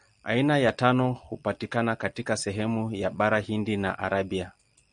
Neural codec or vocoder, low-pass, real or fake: none; 9.9 kHz; real